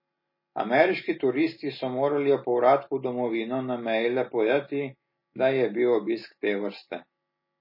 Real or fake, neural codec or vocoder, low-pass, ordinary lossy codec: real; none; 5.4 kHz; MP3, 24 kbps